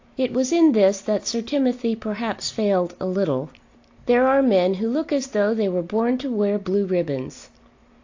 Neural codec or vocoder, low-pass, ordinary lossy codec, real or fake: none; 7.2 kHz; AAC, 48 kbps; real